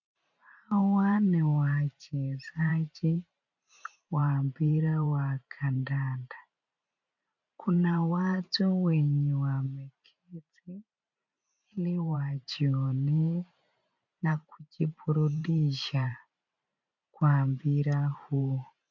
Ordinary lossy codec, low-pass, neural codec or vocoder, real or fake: MP3, 48 kbps; 7.2 kHz; vocoder, 44.1 kHz, 128 mel bands every 512 samples, BigVGAN v2; fake